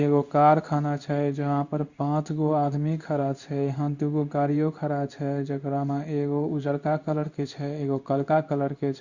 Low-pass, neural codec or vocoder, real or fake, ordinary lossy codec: 7.2 kHz; codec, 16 kHz in and 24 kHz out, 1 kbps, XY-Tokenizer; fake; Opus, 64 kbps